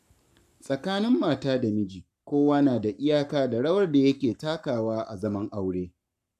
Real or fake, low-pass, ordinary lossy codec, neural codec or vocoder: fake; 14.4 kHz; none; codec, 44.1 kHz, 7.8 kbps, Pupu-Codec